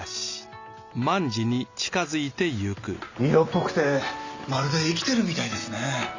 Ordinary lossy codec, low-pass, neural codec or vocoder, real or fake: Opus, 64 kbps; 7.2 kHz; none; real